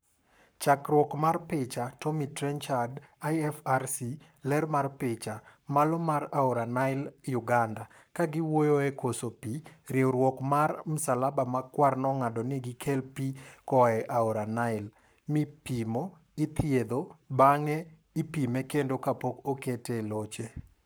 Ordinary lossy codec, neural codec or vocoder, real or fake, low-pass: none; codec, 44.1 kHz, 7.8 kbps, Pupu-Codec; fake; none